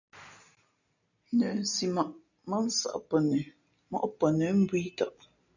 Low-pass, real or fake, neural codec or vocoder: 7.2 kHz; real; none